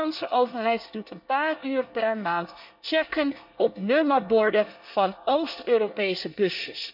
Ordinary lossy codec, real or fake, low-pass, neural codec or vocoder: none; fake; 5.4 kHz; codec, 24 kHz, 1 kbps, SNAC